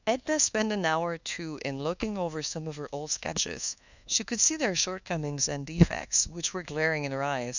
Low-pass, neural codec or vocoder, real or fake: 7.2 kHz; codec, 24 kHz, 1.2 kbps, DualCodec; fake